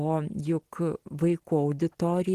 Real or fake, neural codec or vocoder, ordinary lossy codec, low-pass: real; none; Opus, 16 kbps; 14.4 kHz